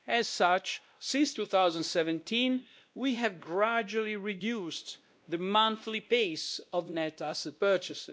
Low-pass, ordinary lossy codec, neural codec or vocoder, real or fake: none; none; codec, 16 kHz, 1 kbps, X-Codec, WavLM features, trained on Multilingual LibriSpeech; fake